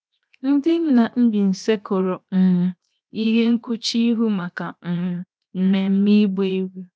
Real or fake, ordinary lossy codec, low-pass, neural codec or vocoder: fake; none; none; codec, 16 kHz, 0.7 kbps, FocalCodec